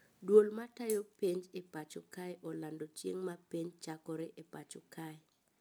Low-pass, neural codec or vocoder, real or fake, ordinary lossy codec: none; none; real; none